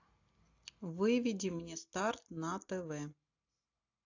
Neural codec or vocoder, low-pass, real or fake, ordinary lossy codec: none; 7.2 kHz; real; AAC, 48 kbps